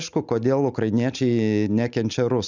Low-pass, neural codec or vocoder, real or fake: 7.2 kHz; none; real